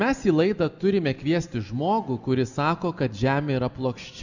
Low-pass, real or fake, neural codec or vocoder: 7.2 kHz; real; none